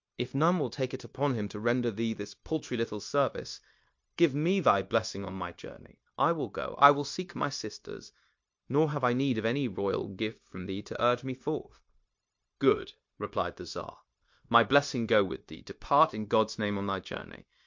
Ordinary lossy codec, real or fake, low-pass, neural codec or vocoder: MP3, 48 kbps; fake; 7.2 kHz; codec, 16 kHz, 0.9 kbps, LongCat-Audio-Codec